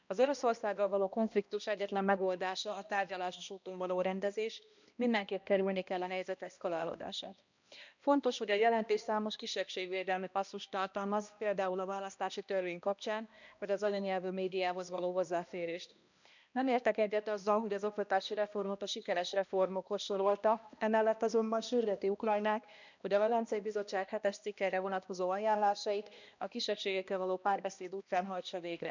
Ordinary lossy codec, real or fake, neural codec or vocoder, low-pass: none; fake; codec, 16 kHz, 1 kbps, X-Codec, HuBERT features, trained on balanced general audio; 7.2 kHz